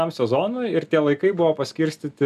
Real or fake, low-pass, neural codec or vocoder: real; 14.4 kHz; none